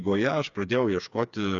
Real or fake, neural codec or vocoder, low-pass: fake; codec, 16 kHz, 4 kbps, FreqCodec, smaller model; 7.2 kHz